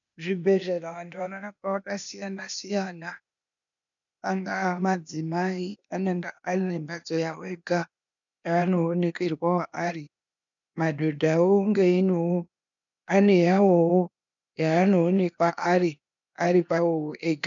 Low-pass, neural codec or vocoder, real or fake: 7.2 kHz; codec, 16 kHz, 0.8 kbps, ZipCodec; fake